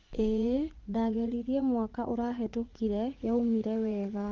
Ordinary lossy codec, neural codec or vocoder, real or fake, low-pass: Opus, 16 kbps; vocoder, 44.1 kHz, 80 mel bands, Vocos; fake; 7.2 kHz